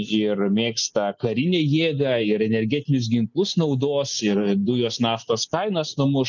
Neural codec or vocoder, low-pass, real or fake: none; 7.2 kHz; real